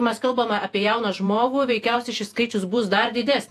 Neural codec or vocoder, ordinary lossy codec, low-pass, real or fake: vocoder, 48 kHz, 128 mel bands, Vocos; AAC, 64 kbps; 14.4 kHz; fake